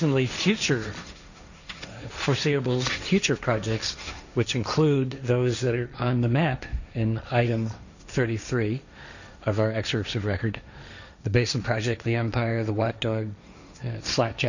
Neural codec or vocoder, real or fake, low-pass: codec, 16 kHz, 1.1 kbps, Voila-Tokenizer; fake; 7.2 kHz